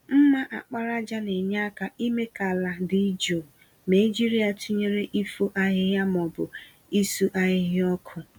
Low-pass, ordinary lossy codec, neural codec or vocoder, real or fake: 19.8 kHz; none; none; real